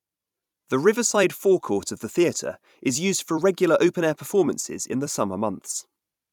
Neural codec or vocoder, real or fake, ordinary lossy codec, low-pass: vocoder, 44.1 kHz, 128 mel bands every 256 samples, BigVGAN v2; fake; none; 19.8 kHz